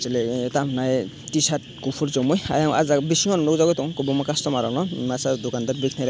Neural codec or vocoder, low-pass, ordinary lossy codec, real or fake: none; none; none; real